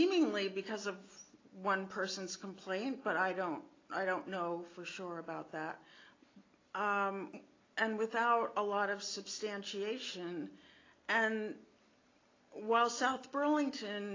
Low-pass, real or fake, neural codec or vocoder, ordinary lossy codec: 7.2 kHz; real; none; AAC, 32 kbps